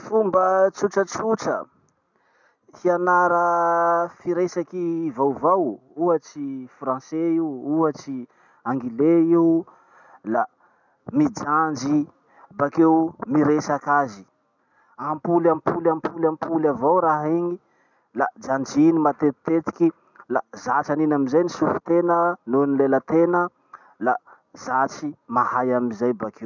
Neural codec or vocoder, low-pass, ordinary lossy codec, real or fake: none; 7.2 kHz; none; real